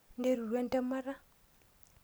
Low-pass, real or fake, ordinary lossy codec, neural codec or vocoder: none; real; none; none